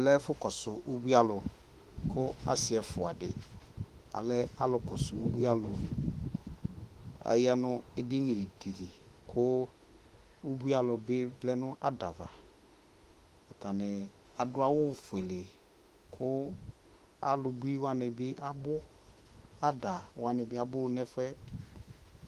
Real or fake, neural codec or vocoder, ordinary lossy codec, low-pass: fake; autoencoder, 48 kHz, 32 numbers a frame, DAC-VAE, trained on Japanese speech; Opus, 24 kbps; 14.4 kHz